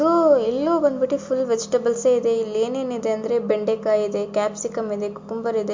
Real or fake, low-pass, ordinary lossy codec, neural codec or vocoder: real; 7.2 kHz; MP3, 48 kbps; none